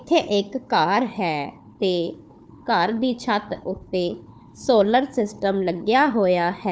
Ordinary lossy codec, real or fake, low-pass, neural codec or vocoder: none; fake; none; codec, 16 kHz, 4 kbps, FunCodec, trained on Chinese and English, 50 frames a second